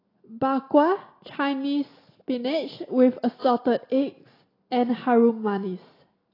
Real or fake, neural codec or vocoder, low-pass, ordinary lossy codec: real; none; 5.4 kHz; AAC, 24 kbps